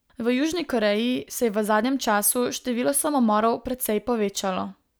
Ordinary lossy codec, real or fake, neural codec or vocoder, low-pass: none; real; none; none